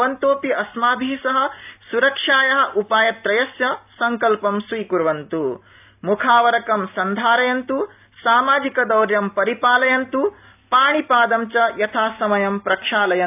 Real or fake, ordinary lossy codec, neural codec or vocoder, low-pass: real; AAC, 32 kbps; none; 3.6 kHz